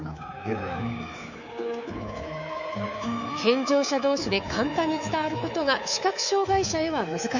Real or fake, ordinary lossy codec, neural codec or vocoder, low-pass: fake; none; codec, 24 kHz, 3.1 kbps, DualCodec; 7.2 kHz